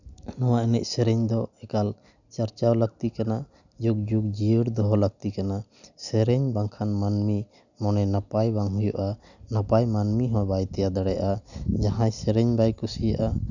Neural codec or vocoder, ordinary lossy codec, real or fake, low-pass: none; none; real; 7.2 kHz